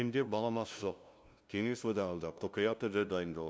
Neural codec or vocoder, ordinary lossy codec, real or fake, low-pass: codec, 16 kHz, 0.5 kbps, FunCodec, trained on LibriTTS, 25 frames a second; none; fake; none